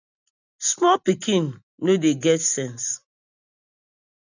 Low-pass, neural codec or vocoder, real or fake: 7.2 kHz; none; real